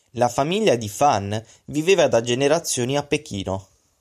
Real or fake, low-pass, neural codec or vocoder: fake; 14.4 kHz; vocoder, 44.1 kHz, 128 mel bands every 512 samples, BigVGAN v2